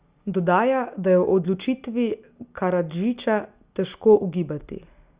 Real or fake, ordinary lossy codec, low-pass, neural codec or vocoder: real; Opus, 64 kbps; 3.6 kHz; none